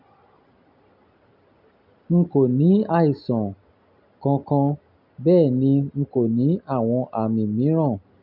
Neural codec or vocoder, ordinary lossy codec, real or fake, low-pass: none; none; real; 5.4 kHz